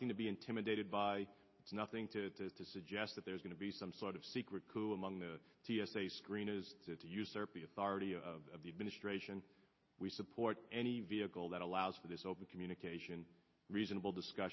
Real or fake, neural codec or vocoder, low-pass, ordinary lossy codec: real; none; 7.2 kHz; MP3, 24 kbps